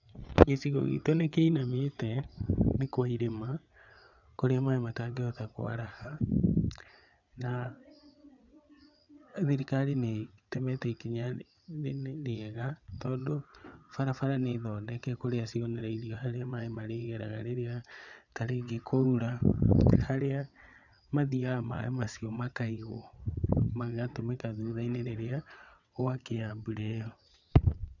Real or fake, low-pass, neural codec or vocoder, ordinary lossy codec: fake; 7.2 kHz; vocoder, 22.05 kHz, 80 mel bands, WaveNeXt; none